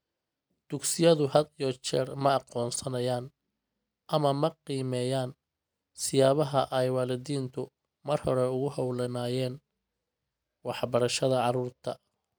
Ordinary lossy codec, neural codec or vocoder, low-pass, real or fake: none; none; none; real